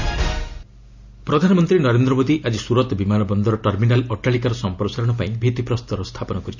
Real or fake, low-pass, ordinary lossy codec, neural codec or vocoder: real; 7.2 kHz; none; none